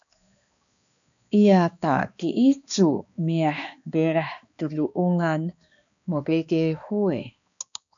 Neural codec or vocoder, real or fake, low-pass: codec, 16 kHz, 2 kbps, X-Codec, HuBERT features, trained on balanced general audio; fake; 7.2 kHz